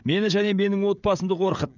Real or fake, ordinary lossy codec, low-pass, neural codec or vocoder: fake; none; 7.2 kHz; codec, 16 kHz, 16 kbps, FreqCodec, smaller model